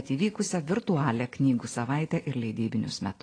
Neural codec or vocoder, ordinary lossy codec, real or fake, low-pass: none; AAC, 32 kbps; real; 9.9 kHz